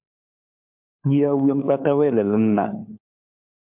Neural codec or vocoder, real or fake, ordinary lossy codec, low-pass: codec, 16 kHz, 4 kbps, FunCodec, trained on LibriTTS, 50 frames a second; fake; MP3, 32 kbps; 3.6 kHz